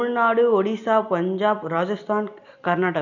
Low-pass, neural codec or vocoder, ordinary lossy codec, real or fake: 7.2 kHz; none; none; real